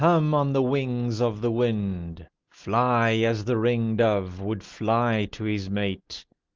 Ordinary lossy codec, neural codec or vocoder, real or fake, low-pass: Opus, 32 kbps; none; real; 7.2 kHz